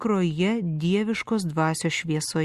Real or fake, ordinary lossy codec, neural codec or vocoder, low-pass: real; Opus, 64 kbps; none; 14.4 kHz